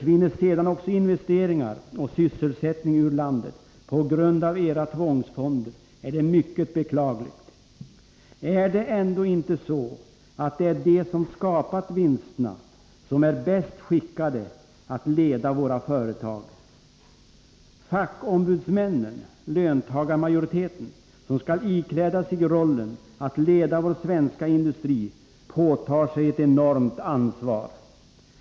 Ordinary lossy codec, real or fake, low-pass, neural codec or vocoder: none; real; none; none